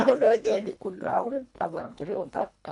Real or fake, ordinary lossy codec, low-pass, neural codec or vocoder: fake; none; 10.8 kHz; codec, 24 kHz, 1.5 kbps, HILCodec